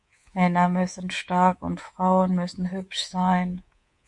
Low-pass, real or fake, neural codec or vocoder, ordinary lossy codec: 10.8 kHz; fake; codec, 24 kHz, 3.1 kbps, DualCodec; MP3, 48 kbps